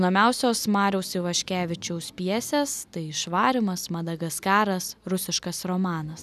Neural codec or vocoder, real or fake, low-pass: none; real; 14.4 kHz